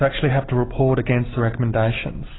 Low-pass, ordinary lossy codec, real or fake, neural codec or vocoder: 7.2 kHz; AAC, 16 kbps; real; none